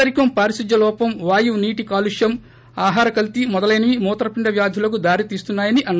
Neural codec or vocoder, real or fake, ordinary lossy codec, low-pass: none; real; none; none